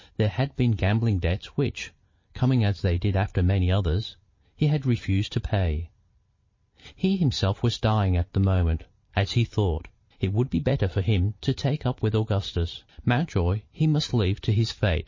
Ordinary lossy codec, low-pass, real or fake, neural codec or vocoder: MP3, 32 kbps; 7.2 kHz; fake; vocoder, 44.1 kHz, 128 mel bands every 512 samples, BigVGAN v2